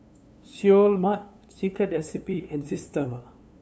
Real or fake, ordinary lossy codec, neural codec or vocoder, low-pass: fake; none; codec, 16 kHz, 2 kbps, FunCodec, trained on LibriTTS, 25 frames a second; none